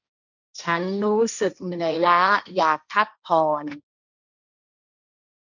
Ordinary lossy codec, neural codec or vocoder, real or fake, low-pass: none; codec, 16 kHz, 1.1 kbps, Voila-Tokenizer; fake; 7.2 kHz